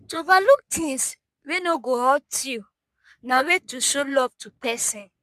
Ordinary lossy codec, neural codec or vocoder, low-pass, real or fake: MP3, 96 kbps; codec, 44.1 kHz, 3.4 kbps, Pupu-Codec; 14.4 kHz; fake